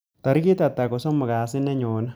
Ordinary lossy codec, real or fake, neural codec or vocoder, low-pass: none; real; none; none